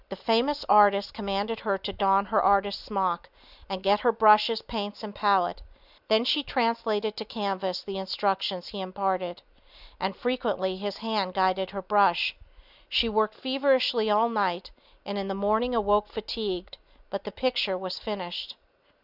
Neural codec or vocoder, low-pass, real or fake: none; 5.4 kHz; real